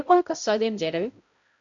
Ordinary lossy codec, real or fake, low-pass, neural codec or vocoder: MP3, 64 kbps; fake; 7.2 kHz; codec, 16 kHz, 0.5 kbps, X-Codec, HuBERT features, trained on balanced general audio